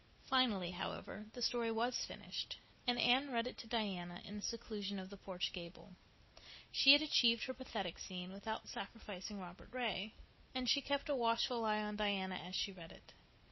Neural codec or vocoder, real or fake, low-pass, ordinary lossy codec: none; real; 7.2 kHz; MP3, 24 kbps